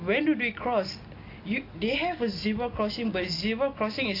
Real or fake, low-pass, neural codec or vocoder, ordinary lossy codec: real; 5.4 kHz; none; AAC, 32 kbps